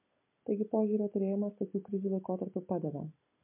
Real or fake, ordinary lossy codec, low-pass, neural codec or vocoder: real; MP3, 32 kbps; 3.6 kHz; none